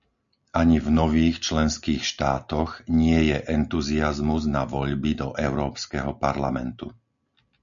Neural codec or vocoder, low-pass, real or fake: none; 7.2 kHz; real